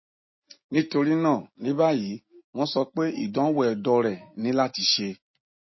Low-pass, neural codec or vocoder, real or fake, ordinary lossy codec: 7.2 kHz; none; real; MP3, 24 kbps